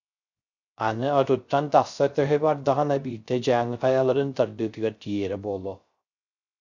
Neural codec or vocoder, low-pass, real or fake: codec, 16 kHz, 0.3 kbps, FocalCodec; 7.2 kHz; fake